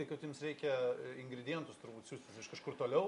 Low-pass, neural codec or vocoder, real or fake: 10.8 kHz; none; real